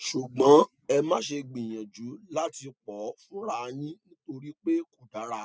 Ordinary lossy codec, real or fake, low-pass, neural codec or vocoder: none; real; none; none